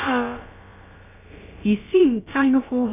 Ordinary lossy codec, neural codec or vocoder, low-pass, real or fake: none; codec, 16 kHz, about 1 kbps, DyCAST, with the encoder's durations; 3.6 kHz; fake